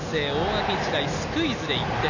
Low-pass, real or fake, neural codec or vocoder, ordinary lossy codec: 7.2 kHz; real; none; none